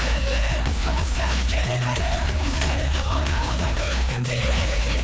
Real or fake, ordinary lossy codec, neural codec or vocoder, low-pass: fake; none; codec, 16 kHz, 2 kbps, FreqCodec, larger model; none